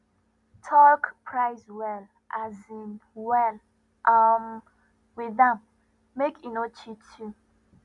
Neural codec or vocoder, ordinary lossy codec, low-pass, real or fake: none; none; 10.8 kHz; real